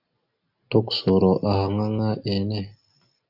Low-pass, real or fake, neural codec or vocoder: 5.4 kHz; real; none